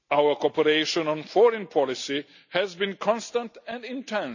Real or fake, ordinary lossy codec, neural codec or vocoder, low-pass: real; none; none; 7.2 kHz